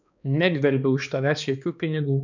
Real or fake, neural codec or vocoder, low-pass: fake; codec, 16 kHz, 2 kbps, X-Codec, HuBERT features, trained on balanced general audio; 7.2 kHz